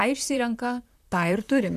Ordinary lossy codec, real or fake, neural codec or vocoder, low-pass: MP3, 96 kbps; fake; vocoder, 44.1 kHz, 128 mel bands, Pupu-Vocoder; 14.4 kHz